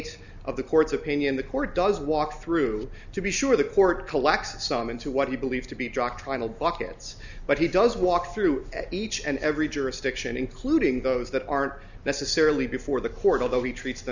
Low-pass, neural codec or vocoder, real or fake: 7.2 kHz; none; real